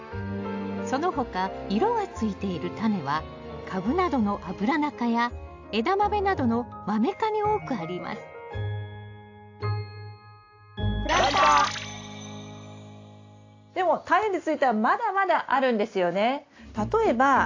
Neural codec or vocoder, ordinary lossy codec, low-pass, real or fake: none; AAC, 48 kbps; 7.2 kHz; real